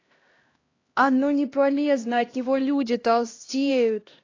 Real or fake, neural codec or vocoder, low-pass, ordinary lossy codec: fake; codec, 16 kHz, 1 kbps, X-Codec, HuBERT features, trained on LibriSpeech; 7.2 kHz; AAC, 32 kbps